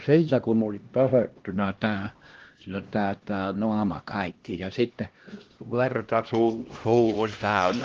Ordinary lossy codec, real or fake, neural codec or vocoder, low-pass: Opus, 16 kbps; fake; codec, 16 kHz, 1 kbps, X-Codec, HuBERT features, trained on LibriSpeech; 7.2 kHz